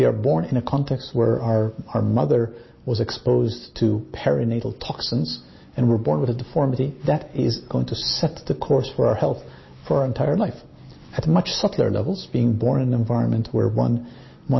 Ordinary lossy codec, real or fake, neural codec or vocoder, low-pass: MP3, 24 kbps; real; none; 7.2 kHz